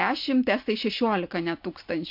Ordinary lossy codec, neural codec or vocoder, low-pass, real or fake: MP3, 48 kbps; none; 5.4 kHz; real